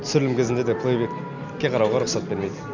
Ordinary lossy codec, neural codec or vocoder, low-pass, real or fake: none; none; 7.2 kHz; real